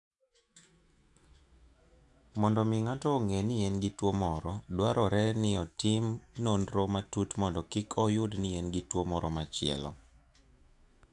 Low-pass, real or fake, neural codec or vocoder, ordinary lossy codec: 10.8 kHz; fake; autoencoder, 48 kHz, 128 numbers a frame, DAC-VAE, trained on Japanese speech; AAC, 64 kbps